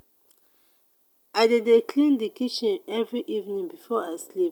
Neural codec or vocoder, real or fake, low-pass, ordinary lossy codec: none; real; 19.8 kHz; none